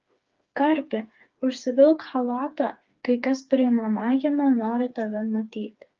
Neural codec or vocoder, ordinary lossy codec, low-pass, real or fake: codec, 16 kHz, 4 kbps, FreqCodec, smaller model; Opus, 24 kbps; 7.2 kHz; fake